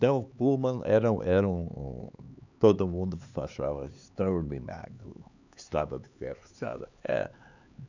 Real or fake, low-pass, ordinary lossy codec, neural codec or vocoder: fake; 7.2 kHz; none; codec, 16 kHz, 4 kbps, X-Codec, HuBERT features, trained on LibriSpeech